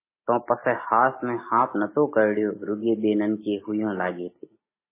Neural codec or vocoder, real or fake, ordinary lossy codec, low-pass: none; real; MP3, 16 kbps; 3.6 kHz